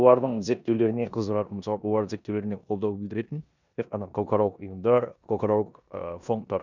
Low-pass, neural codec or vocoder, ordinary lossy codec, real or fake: 7.2 kHz; codec, 16 kHz in and 24 kHz out, 0.9 kbps, LongCat-Audio-Codec, four codebook decoder; none; fake